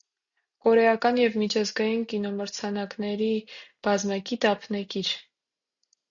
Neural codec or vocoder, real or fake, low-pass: none; real; 7.2 kHz